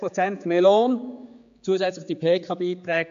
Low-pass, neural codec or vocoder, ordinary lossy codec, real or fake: 7.2 kHz; codec, 16 kHz, 4 kbps, X-Codec, HuBERT features, trained on general audio; AAC, 64 kbps; fake